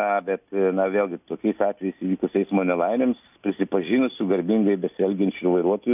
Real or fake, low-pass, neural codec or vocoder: real; 3.6 kHz; none